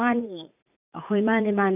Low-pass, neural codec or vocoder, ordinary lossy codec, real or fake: 3.6 kHz; vocoder, 44.1 kHz, 80 mel bands, Vocos; none; fake